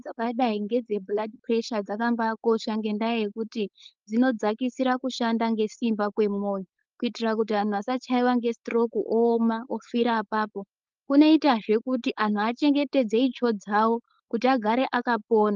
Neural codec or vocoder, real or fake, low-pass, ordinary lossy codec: codec, 16 kHz, 4.8 kbps, FACodec; fake; 7.2 kHz; Opus, 24 kbps